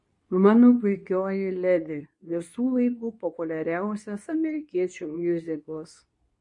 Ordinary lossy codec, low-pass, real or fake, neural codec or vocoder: MP3, 48 kbps; 10.8 kHz; fake; codec, 24 kHz, 0.9 kbps, WavTokenizer, medium speech release version 2